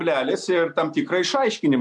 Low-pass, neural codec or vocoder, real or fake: 10.8 kHz; none; real